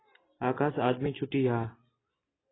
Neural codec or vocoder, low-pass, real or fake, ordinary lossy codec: none; 7.2 kHz; real; AAC, 16 kbps